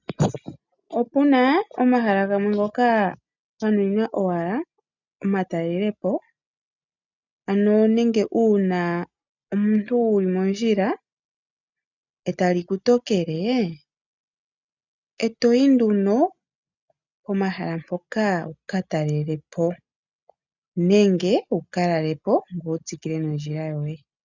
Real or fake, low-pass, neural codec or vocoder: real; 7.2 kHz; none